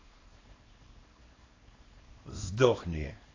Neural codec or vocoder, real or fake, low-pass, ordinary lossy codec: codec, 24 kHz, 0.9 kbps, WavTokenizer, small release; fake; 7.2 kHz; AAC, 32 kbps